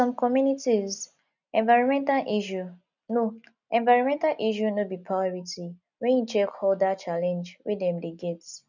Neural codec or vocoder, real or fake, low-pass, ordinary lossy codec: none; real; 7.2 kHz; none